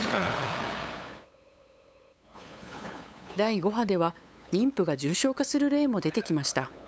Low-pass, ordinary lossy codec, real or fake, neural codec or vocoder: none; none; fake; codec, 16 kHz, 8 kbps, FunCodec, trained on LibriTTS, 25 frames a second